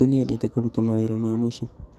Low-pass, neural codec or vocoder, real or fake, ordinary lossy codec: 14.4 kHz; codec, 44.1 kHz, 2.6 kbps, SNAC; fake; none